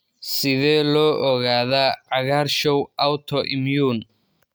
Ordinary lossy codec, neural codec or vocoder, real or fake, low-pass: none; none; real; none